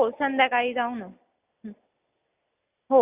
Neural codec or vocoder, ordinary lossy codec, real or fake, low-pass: none; Opus, 64 kbps; real; 3.6 kHz